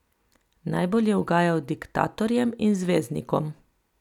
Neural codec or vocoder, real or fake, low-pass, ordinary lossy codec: none; real; 19.8 kHz; none